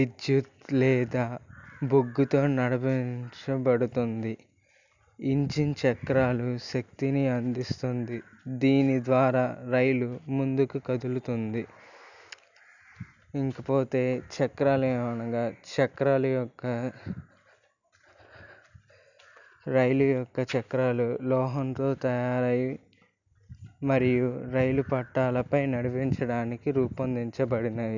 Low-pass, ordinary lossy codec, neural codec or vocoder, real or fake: 7.2 kHz; none; none; real